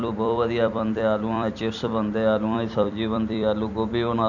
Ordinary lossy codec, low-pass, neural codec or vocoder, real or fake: none; 7.2 kHz; vocoder, 44.1 kHz, 128 mel bands every 256 samples, BigVGAN v2; fake